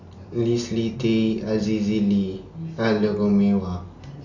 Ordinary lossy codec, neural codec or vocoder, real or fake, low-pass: none; none; real; 7.2 kHz